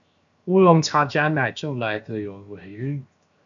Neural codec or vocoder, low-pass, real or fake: codec, 16 kHz, 0.7 kbps, FocalCodec; 7.2 kHz; fake